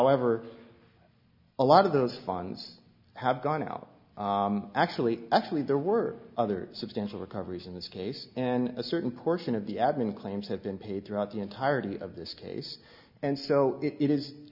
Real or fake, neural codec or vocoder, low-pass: real; none; 5.4 kHz